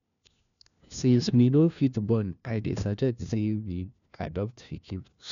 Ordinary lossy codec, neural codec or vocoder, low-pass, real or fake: none; codec, 16 kHz, 1 kbps, FunCodec, trained on LibriTTS, 50 frames a second; 7.2 kHz; fake